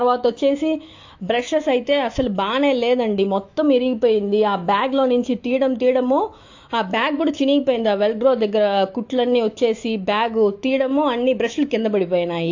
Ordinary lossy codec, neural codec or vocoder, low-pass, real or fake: AAC, 48 kbps; autoencoder, 48 kHz, 128 numbers a frame, DAC-VAE, trained on Japanese speech; 7.2 kHz; fake